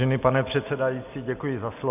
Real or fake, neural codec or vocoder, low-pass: real; none; 3.6 kHz